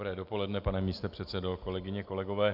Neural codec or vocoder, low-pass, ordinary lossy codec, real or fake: none; 5.4 kHz; MP3, 48 kbps; real